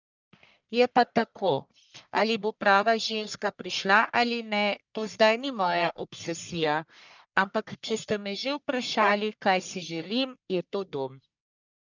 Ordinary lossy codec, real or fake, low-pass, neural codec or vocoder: none; fake; 7.2 kHz; codec, 44.1 kHz, 1.7 kbps, Pupu-Codec